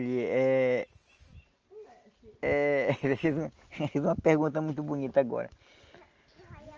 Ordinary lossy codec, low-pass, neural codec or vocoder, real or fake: Opus, 24 kbps; 7.2 kHz; none; real